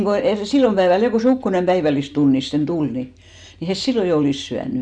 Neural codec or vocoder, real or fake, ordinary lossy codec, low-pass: none; real; none; 9.9 kHz